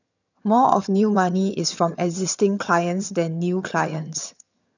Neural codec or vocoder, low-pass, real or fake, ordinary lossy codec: vocoder, 22.05 kHz, 80 mel bands, HiFi-GAN; 7.2 kHz; fake; none